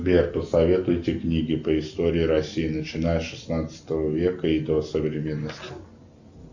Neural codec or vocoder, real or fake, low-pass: none; real; 7.2 kHz